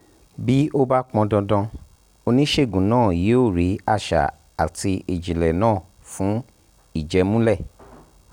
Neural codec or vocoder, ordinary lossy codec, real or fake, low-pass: none; none; real; 19.8 kHz